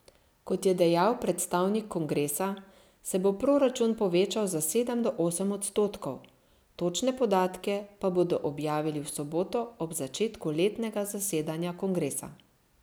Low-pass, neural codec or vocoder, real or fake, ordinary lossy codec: none; none; real; none